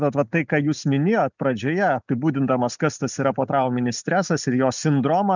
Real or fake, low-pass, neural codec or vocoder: real; 7.2 kHz; none